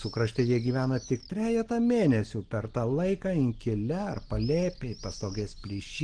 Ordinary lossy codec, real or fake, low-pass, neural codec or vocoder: Opus, 16 kbps; real; 9.9 kHz; none